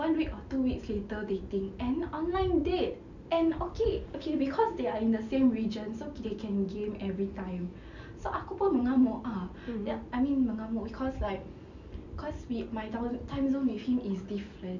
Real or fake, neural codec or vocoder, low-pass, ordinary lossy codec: real; none; 7.2 kHz; none